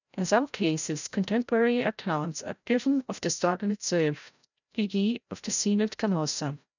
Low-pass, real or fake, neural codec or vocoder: 7.2 kHz; fake; codec, 16 kHz, 0.5 kbps, FreqCodec, larger model